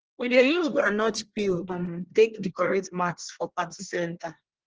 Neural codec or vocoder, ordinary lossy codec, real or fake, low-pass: codec, 24 kHz, 1 kbps, SNAC; Opus, 16 kbps; fake; 7.2 kHz